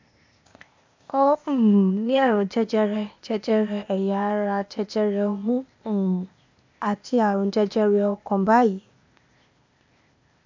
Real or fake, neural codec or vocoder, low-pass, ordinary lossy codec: fake; codec, 16 kHz, 0.8 kbps, ZipCodec; 7.2 kHz; MP3, 64 kbps